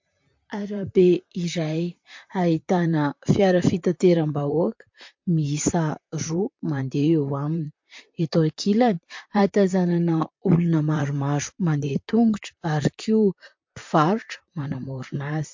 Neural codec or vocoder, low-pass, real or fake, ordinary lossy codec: vocoder, 44.1 kHz, 128 mel bands, Pupu-Vocoder; 7.2 kHz; fake; MP3, 48 kbps